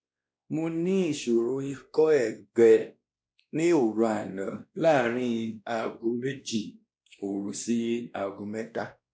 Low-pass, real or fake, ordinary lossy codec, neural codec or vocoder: none; fake; none; codec, 16 kHz, 1 kbps, X-Codec, WavLM features, trained on Multilingual LibriSpeech